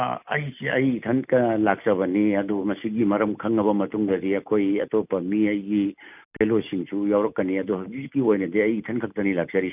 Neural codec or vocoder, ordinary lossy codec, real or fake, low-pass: none; none; real; 3.6 kHz